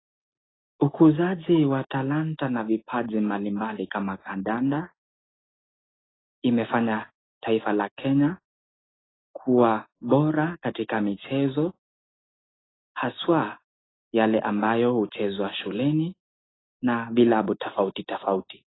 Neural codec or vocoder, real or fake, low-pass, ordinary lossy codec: none; real; 7.2 kHz; AAC, 16 kbps